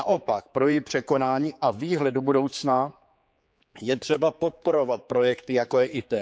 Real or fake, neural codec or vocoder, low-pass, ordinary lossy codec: fake; codec, 16 kHz, 4 kbps, X-Codec, HuBERT features, trained on general audio; none; none